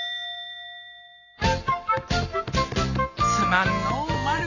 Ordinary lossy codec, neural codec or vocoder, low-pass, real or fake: none; none; 7.2 kHz; real